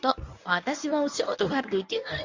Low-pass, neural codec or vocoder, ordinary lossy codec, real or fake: 7.2 kHz; codec, 24 kHz, 0.9 kbps, WavTokenizer, medium speech release version 1; AAC, 48 kbps; fake